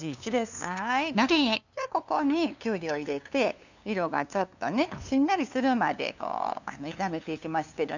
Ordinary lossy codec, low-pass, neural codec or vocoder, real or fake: none; 7.2 kHz; codec, 16 kHz, 2 kbps, FunCodec, trained on LibriTTS, 25 frames a second; fake